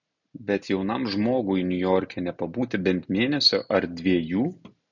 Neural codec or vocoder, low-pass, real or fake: none; 7.2 kHz; real